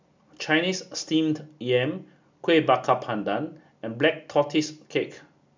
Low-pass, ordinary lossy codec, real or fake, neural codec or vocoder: 7.2 kHz; MP3, 64 kbps; real; none